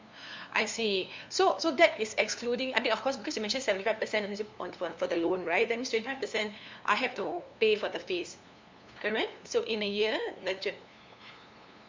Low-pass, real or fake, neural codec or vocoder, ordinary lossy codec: 7.2 kHz; fake; codec, 16 kHz, 2 kbps, FunCodec, trained on LibriTTS, 25 frames a second; MP3, 64 kbps